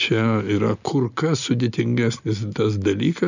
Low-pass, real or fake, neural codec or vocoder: 7.2 kHz; real; none